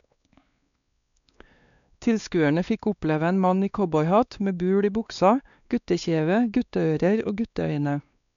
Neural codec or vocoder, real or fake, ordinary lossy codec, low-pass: codec, 16 kHz, 2 kbps, X-Codec, WavLM features, trained on Multilingual LibriSpeech; fake; none; 7.2 kHz